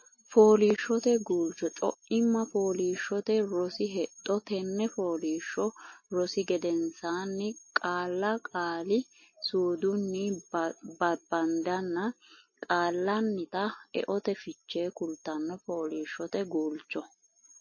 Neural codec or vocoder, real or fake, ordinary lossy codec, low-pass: none; real; MP3, 32 kbps; 7.2 kHz